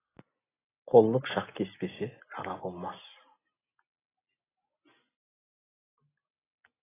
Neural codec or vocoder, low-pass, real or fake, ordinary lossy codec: none; 3.6 kHz; real; AAC, 16 kbps